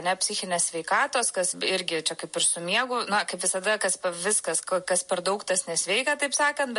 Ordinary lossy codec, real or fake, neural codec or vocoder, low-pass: MP3, 48 kbps; real; none; 10.8 kHz